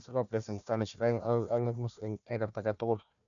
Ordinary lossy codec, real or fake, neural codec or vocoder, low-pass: none; fake; codec, 16 kHz, 1 kbps, FunCodec, trained on Chinese and English, 50 frames a second; 7.2 kHz